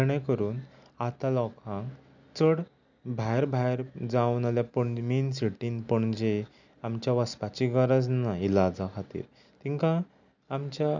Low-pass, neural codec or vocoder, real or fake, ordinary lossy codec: 7.2 kHz; none; real; none